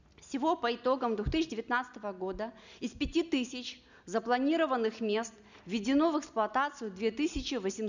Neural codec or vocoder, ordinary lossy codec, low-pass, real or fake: none; none; 7.2 kHz; real